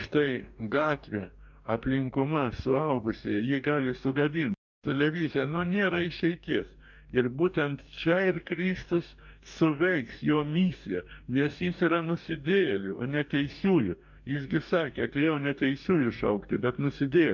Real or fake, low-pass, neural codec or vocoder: fake; 7.2 kHz; codec, 44.1 kHz, 2.6 kbps, DAC